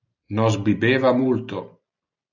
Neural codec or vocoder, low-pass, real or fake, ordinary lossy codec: none; 7.2 kHz; real; AAC, 48 kbps